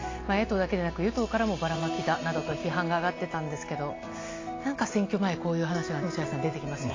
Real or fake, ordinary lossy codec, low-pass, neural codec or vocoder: real; AAC, 32 kbps; 7.2 kHz; none